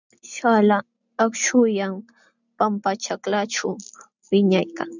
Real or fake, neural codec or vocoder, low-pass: real; none; 7.2 kHz